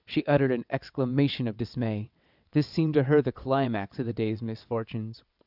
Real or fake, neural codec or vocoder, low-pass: fake; vocoder, 22.05 kHz, 80 mel bands, WaveNeXt; 5.4 kHz